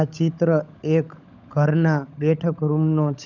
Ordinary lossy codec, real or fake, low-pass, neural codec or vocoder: none; fake; 7.2 kHz; codec, 16 kHz, 16 kbps, FunCodec, trained on LibriTTS, 50 frames a second